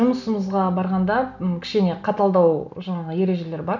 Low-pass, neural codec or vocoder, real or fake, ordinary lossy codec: 7.2 kHz; none; real; none